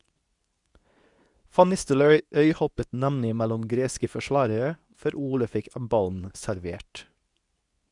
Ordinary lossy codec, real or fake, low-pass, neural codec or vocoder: none; fake; 10.8 kHz; codec, 24 kHz, 0.9 kbps, WavTokenizer, medium speech release version 2